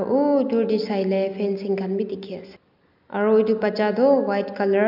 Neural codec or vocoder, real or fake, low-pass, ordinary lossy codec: none; real; 5.4 kHz; none